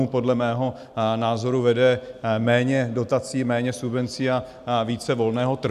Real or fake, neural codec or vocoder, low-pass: real; none; 14.4 kHz